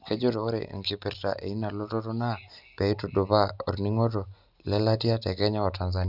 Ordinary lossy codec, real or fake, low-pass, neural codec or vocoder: none; real; 5.4 kHz; none